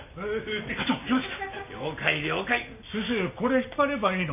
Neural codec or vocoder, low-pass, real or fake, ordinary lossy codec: none; 3.6 kHz; real; none